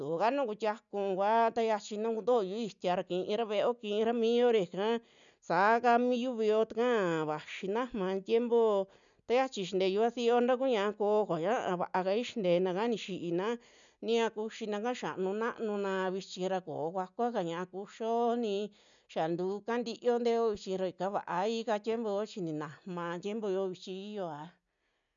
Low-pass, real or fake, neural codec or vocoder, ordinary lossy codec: 7.2 kHz; real; none; none